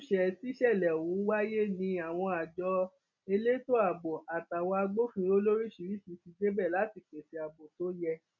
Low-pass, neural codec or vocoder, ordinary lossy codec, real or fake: 7.2 kHz; none; none; real